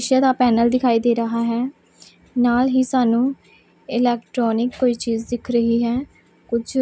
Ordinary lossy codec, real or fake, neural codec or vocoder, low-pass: none; real; none; none